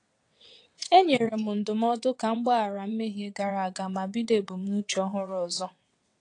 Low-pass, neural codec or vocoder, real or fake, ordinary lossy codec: 9.9 kHz; vocoder, 22.05 kHz, 80 mel bands, WaveNeXt; fake; AAC, 48 kbps